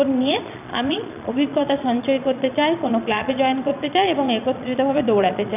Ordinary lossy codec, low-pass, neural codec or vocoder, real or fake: none; 3.6 kHz; vocoder, 22.05 kHz, 80 mel bands, Vocos; fake